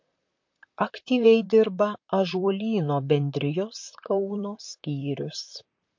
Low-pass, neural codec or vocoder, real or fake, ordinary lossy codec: 7.2 kHz; vocoder, 44.1 kHz, 128 mel bands, Pupu-Vocoder; fake; MP3, 48 kbps